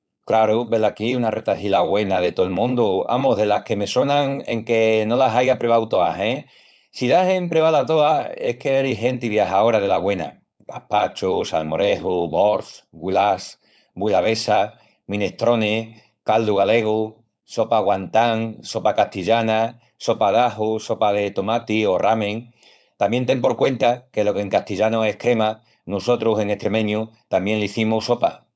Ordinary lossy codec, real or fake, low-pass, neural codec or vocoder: none; fake; none; codec, 16 kHz, 4.8 kbps, FACodec